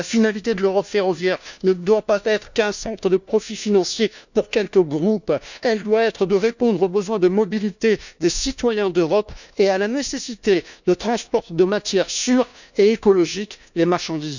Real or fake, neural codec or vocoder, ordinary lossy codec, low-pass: fake; codec, 16 kHz, 1 kbps, FunCodec, trained on LibriTTS, 50 frames a second; none; 7.2 kHz